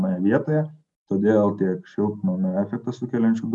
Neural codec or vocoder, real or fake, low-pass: none; real; 10.8 kHz